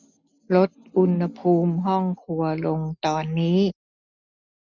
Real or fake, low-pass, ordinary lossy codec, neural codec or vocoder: real; 7.2 kHz; none; none